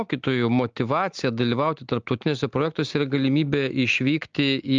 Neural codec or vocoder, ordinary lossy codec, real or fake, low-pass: none; Opus, 24 kbps; real; 7.2 kHz